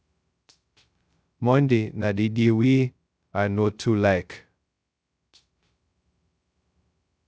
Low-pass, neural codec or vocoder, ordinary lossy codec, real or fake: none; codec, 16 kHz, 0.2 kbps, FocalCodec; none; fake